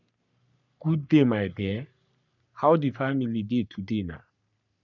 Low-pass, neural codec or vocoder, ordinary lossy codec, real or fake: 7.2 kHz; codec, 44.1 kHz, 3.4 kbps, Pupu-Codec; none; fake